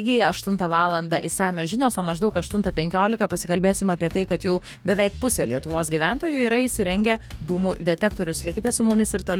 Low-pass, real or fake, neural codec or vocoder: 19.8 kHz; fake; codec, 44.1 kHz, 2.6 kbps, DAC